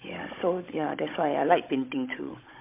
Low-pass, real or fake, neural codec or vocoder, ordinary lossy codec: 3.6 kHz; fake; codec, 16 kHz, 16 kbps, FunCodec, trained on Chinese and English, 50 frames a second; MP3, 24 kbps